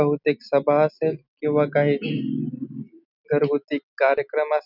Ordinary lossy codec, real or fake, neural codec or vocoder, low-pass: none; real; none; 5.4 kHz